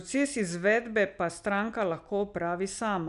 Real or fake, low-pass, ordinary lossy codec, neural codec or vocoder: real; 10.8 kHz; none; none